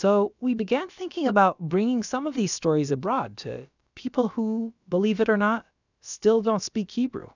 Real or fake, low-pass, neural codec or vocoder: fake; 7.2 kHz; codec, 16 kHz, about 1 kbps, DyCAST, with the encoder's durations